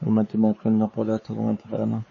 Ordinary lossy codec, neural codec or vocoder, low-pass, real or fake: MP3, 32 kbps; codec, 16 kHz, 4 kbps, X-Codec, WavLM features, trained on Multilingual LibriSpeech; 7.2 kHz; fake